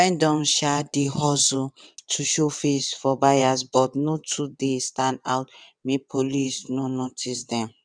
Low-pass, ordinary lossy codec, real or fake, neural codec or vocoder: 9.9 kHz; none; fake; vocoder, 22.05 kHz, 80 mel bands, WaveNeXt